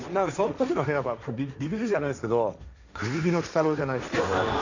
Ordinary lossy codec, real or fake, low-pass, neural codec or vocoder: none; fake; 7.2 kHz; codec, 16 kHz, 1.1 kbps, Voila-Tokenizer